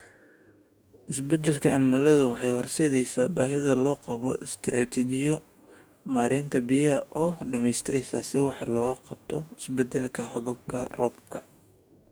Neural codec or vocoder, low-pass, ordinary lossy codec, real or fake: codec, 44.1 kHz, 2.6 kbps, DAC; none; none; fake